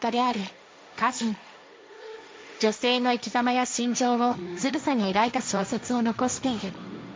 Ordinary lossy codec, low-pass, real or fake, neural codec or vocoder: none; none; fake; codec, 16 kHz, 1.1 kbps, Voila-Tokenizer